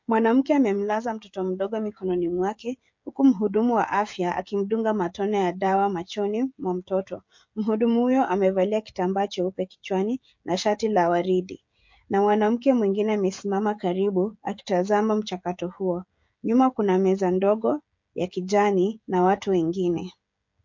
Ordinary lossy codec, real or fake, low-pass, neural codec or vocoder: MP3, 48 kbps; fake; 7.2 kHz; codec, 16 kHz, 16 kbps, FreqCodec, smaller model